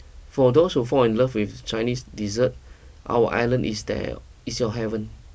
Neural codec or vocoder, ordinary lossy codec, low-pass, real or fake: none; none; none; real